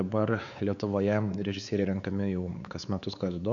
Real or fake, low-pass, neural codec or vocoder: fake; 7.2 kHz; codec, 16 kHz, 4 kbps, X-Codec, WavLM features, trained on Multilingual LibriSpeech